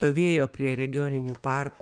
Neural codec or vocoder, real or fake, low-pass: codec, 24 kHz, 1 kbps, SNAC; fake; 9.9 kHz